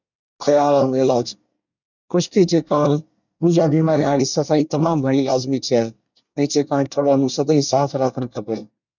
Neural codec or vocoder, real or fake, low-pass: codec, 24 kHz, 1 kbps, SNAC; fake; 7.2 kHz